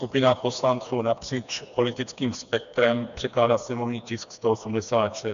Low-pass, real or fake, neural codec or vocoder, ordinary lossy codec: 7.2 kHz; fake; codec, 16 kHz, 2 kbps, FreqCodec, smaller model; MP3, 96 kbps